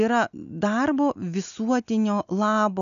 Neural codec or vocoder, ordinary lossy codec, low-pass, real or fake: none; AAC, 96 kbps; 7.2 kHz; real